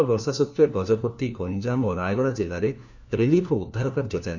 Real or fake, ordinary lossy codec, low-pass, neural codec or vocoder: fake; none; 7.2 kHz; codec, 16 kHz, 1 kbps, FunCodec, trained on LibriTTS, 50 frames a second